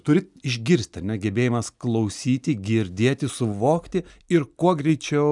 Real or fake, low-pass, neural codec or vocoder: real; 10.8 kHz; none